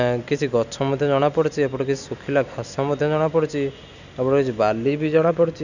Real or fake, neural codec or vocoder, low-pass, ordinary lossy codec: real; none; 7.2 kHz; none